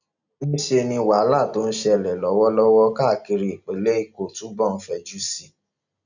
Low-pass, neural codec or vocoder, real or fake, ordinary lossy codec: 7.2 kHz; none; real; none